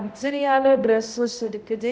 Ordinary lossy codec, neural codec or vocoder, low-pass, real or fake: none; codec, 16 kHz, 0.5 kbps, X-Codec, HuBERT features, trained on balanced general audio; none; fake